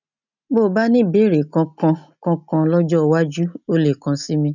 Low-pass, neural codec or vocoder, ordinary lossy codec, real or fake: 7.2 kHz; none; none; real